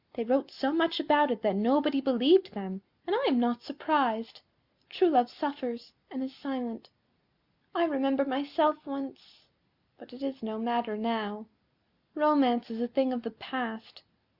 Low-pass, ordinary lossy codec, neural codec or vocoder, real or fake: 5.4 kHz; Opus, 64 kbps; none; real